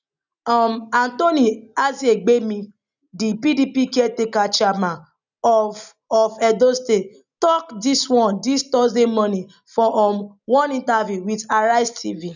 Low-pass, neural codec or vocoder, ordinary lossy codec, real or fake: 7.2 kHz; none; none; real